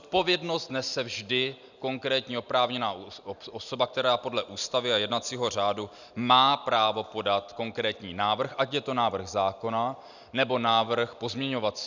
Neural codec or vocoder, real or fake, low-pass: none; real; 7.2 kHz